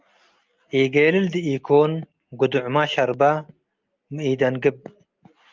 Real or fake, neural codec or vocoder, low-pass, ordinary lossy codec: real; none; 7.2 kHz; Opus, 32 kbps